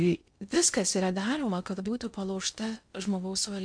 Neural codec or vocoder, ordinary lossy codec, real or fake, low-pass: codec, 16 kHz in and 24 kHz out, 0.6 kbps, FocalCodec, streaming, 2048 codes; MP3, 64 kbps; fake; 9.9 kHz